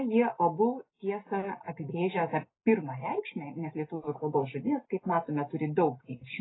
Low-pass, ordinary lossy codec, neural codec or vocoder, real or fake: 7.2 kHz; AAC, 16 kbps; none; real